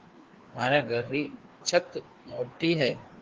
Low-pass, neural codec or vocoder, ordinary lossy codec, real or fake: 7.2 kHz; codec, 16 kHz, 2 kbps, FreqCodec, larger model; Opus, 16 kbps; fake